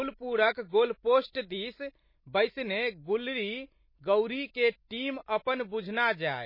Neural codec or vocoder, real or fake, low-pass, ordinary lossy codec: none; real; 5.4 kHz; MP3, 24 kbps